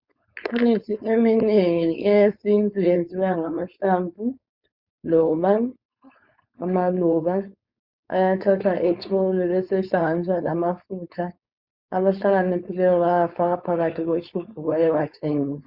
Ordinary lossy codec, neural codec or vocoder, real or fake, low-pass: Opus, 64 kbps; codec, 16 kHz, 4.8 kbps, FACodec; fake; 5.4 kHz